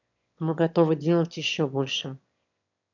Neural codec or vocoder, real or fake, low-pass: autoencoder, 22.05 kHz, a latent of 192 numbers a frame, VITS, trained on one speaker; fake; 7.2 kHz